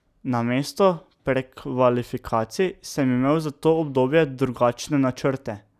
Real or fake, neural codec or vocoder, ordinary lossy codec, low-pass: real; none; none; 14.4 kHz